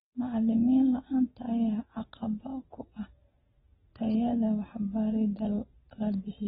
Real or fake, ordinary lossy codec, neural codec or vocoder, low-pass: real; AAC, 16 kbps; none; 19.8 kHz